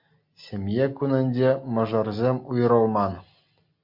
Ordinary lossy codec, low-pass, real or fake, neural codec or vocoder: MP3, 48 kbps; 5.4 kHz; real; none